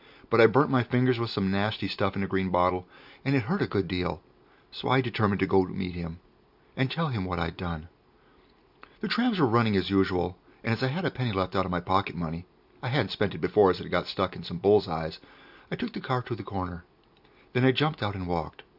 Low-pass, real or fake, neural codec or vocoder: 5.4 kHz; real; none